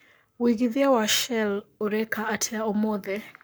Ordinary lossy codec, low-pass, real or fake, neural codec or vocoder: none; none; fake; codec, 44.1 kHz, 7.8 kbps, Pupu-Codec